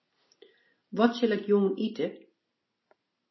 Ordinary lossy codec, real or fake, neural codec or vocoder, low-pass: MP3, 24 kbps; real; none; 7.2 kHz